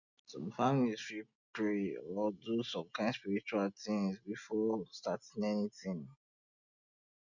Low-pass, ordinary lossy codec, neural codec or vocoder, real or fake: 7.2 kHz; none; none; real